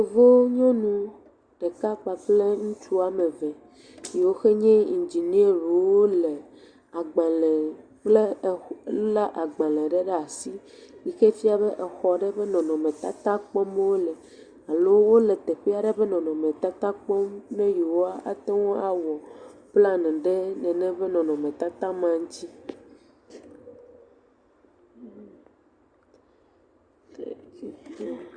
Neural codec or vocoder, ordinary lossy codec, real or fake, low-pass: none; Opus, 64 kbps; real; 9.9 kHz